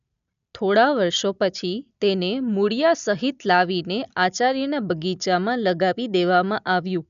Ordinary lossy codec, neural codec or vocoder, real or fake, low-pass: none; none; real; 7.2 kHz